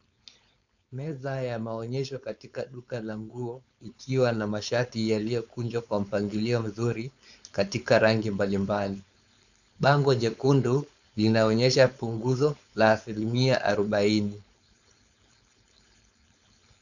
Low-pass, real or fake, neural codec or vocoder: 7.2 kHz; fake; codec, 16 kHz, 4.8 kbps, FACodec